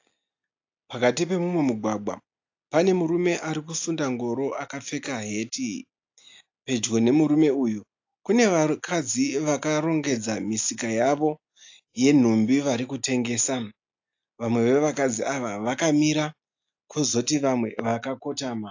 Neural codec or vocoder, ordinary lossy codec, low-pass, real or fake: none; AAC, 48 kbps; 7.2 kHz; real